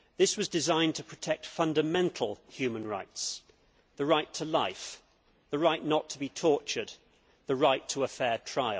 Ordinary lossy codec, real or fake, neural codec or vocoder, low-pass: none; real; none; none